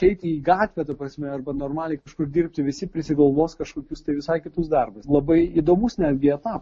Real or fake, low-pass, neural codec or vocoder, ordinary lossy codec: real; 10.8 kHz; none; MP3, 32 kbps